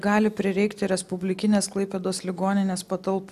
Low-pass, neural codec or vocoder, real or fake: 14.4 kHz; none; real